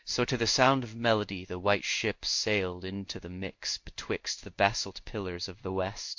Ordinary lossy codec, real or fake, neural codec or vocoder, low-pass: MP3, 48 kbps; fake; codec, 16 kHz in and 24 kHz out, 1 kbps, XY-Tokenizer; 7.2 kHz